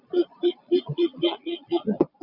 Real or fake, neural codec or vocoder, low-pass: fake; vocoder, 22.05 kHz, 80 mel bands, Vocos; 5.4 kHz